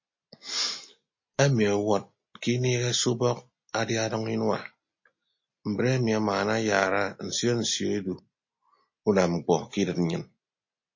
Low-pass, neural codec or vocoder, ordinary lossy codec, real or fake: 7.2 kHz; none; MP3, 32 kbps; real